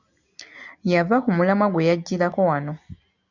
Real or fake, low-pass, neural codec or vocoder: real; 7.2 kHz; none